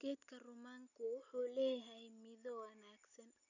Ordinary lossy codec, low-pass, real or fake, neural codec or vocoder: none; 7.2 kHz; real; none